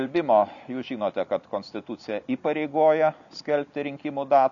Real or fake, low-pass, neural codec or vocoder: real; 7.2 kHz; none